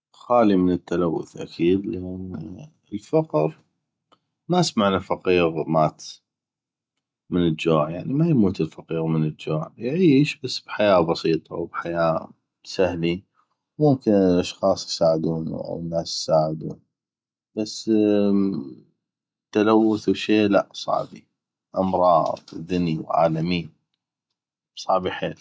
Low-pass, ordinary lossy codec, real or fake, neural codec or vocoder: none; none; real; none